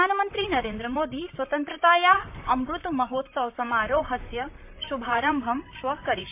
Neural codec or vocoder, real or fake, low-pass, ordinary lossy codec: vocoder, 44.1 kHz, 128 mel bands, Pupu-Vocoder; fake; 3.6 kHz; MP3, 32 kbps